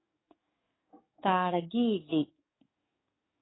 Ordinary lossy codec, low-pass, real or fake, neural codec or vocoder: AAC, 16 kbps; 7.2 kHz; fake; codec, 44.1 kHz, 7.8 kbps, DAC